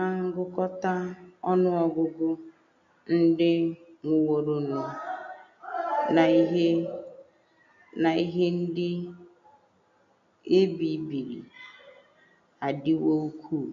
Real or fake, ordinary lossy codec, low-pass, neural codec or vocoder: real; none; 7.2 kHz; none